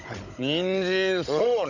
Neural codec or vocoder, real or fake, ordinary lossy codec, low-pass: codec, 16 kHz, 16 kbps, FunCodec, trained on Chinese and English, 50 frames a second; fake; none; 7.2 kHz